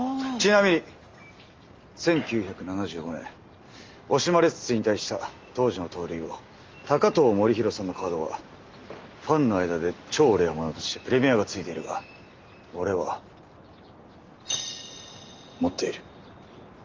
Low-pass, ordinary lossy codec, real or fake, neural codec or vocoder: 7.2 kHz; Opus, 32 kbps; real; none